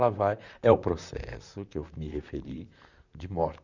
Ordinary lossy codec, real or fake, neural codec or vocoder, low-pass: none; fake; vocoder, 44.1 kHz, 128 mel bands, Pupu-Vocoder; 7.2 kHz